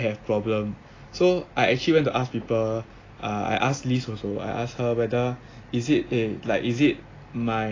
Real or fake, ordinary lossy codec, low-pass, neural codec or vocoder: real; AAC, 32 kbps; 7.2 kHz; none